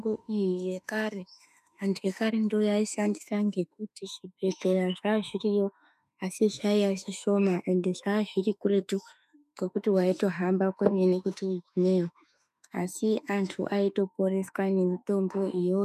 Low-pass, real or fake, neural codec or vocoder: 14.4 kHz; fake; autoencoder, 48 kHz, 32 numbers a frame, DAC-VAE, trained on Japanese speech